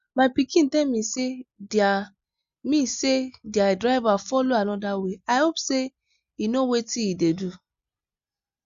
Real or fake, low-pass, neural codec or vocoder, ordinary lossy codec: real; 7.2 kHz; none; Opus, 64 kbps